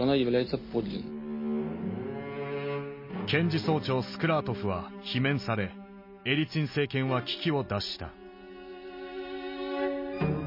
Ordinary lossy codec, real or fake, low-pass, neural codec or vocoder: MP3, 24 kbps; real; 5.4 kHz; none